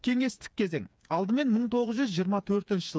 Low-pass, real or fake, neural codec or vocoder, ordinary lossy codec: none; fake; codec, 16 kHz, 4 kbps, FreqCodec, smaller model; none